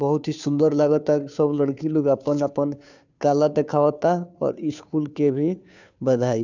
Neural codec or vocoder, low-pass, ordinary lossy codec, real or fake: codec, 16 kHz, 2 kbps, FunCodec, trained on Chinese and English, 25 frames a second; 7.2 kHz; none; fake